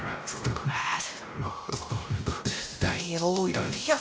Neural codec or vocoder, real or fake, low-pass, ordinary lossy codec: codec, 16 kHz, 0.5 kbps, X-Codec, WavLM features, trained on Multilingual LibriSpeech; fake; none; none